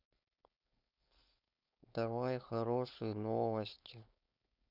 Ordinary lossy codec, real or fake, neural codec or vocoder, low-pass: none; fake; codec, 16 kHz, 4.8 kbps, FACodec; 5.4 kHz